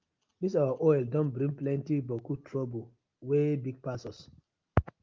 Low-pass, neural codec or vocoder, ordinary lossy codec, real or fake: 7.2 kHz; none; Opus, 24 kbps; real